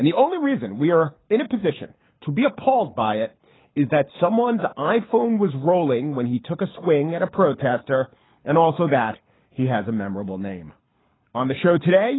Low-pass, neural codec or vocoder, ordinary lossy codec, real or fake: 7.2 kHz; codec, 24 kHz, 6 kbps, HILCodec; AAC, 16 kbps; fake